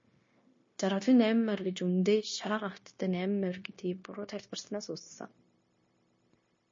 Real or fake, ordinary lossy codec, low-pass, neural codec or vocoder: fake; MP3, 32 kbps; 7.2 kHz; codec, 16 kHz, 0.9 kbps, LongCat-Audio-Codec